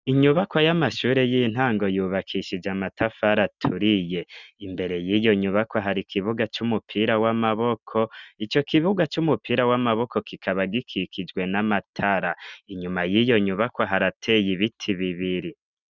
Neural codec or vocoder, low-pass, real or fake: none; 7.2 kHz; real